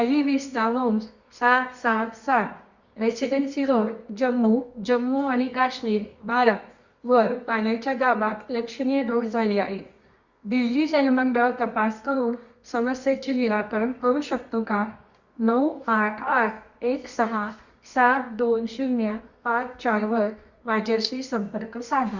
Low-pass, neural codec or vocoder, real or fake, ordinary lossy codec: 7.2 kHz; codec, 24 kHz, 0.9 kbps, WavTokenizer, medium music audio release; fake; Opus, 64 kbps